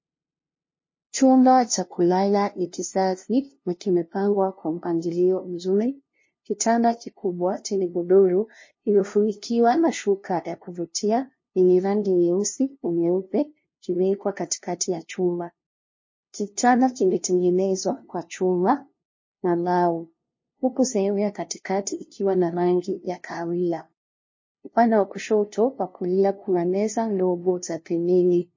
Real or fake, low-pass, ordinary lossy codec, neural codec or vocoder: fake; 7.2 kHz; MP3, 32 kbps; codec, 16 kHz, 0.5 kbps, FunCodec, trained on LibriTTS, 25 frames a second